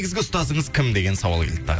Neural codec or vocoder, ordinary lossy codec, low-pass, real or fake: none; none; none; real